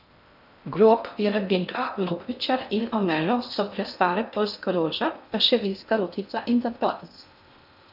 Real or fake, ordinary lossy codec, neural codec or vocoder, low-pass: fake; none; codec, 16 kHz in and 24 kHz out, 0.6 kbps, FocalCodec, streaming, 4096 codes; 5.4 kHz